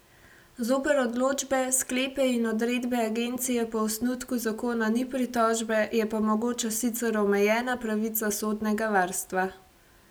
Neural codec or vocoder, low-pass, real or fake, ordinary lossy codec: none; none; real; none